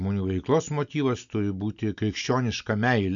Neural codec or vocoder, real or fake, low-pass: none; real; 7.2 kHz